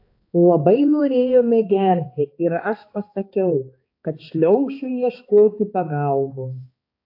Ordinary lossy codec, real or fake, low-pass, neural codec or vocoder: AAC, 32 kbps; fake; 5.4 kHz; codec, 16 kHz, 2 kbps, X-Codec, HuBERT features, trained on balanced general audio